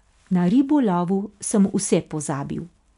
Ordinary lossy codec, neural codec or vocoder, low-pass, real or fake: none; none; 10.8 kHz; real